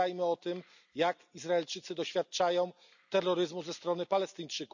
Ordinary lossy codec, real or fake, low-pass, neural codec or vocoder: none; real; 7.2 kHz; none